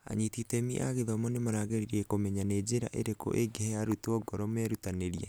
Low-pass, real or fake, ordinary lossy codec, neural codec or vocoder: none; real; none; none